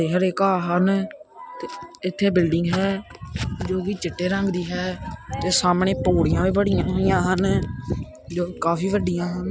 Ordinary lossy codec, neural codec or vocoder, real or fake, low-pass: none; none; real; none